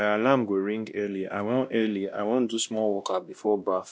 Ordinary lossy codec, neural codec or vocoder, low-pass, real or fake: none; codec, 16 kHz, 1 kbps, X-Codec, WavLM features, trained on Multilingual LibriSpeech; none; fake